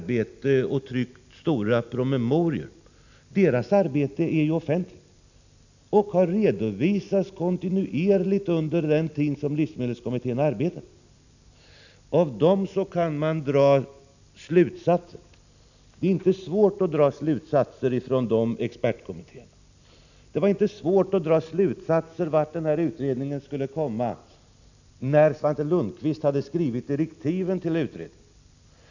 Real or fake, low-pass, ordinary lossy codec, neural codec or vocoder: real; 7.2 kHz; none; none